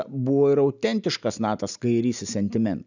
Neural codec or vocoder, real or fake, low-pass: none; real; 7.2 kHz